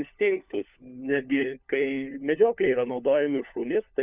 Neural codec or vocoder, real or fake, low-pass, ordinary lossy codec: codec, 16 kHz, 4 kbps, FunCodec, trained on LibriTTS, 50 frames a second; fake; 3.6 kHz; Opus, 64 kbps